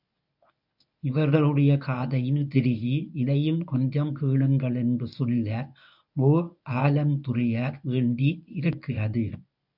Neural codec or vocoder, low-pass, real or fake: codec, 24 kHz, 0.9 kbps, WavTokenizer, medium speech release version 1; 5.4 kHz; fake